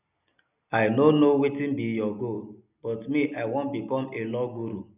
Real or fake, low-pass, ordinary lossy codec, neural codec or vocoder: real; 3.6 kHz; none; none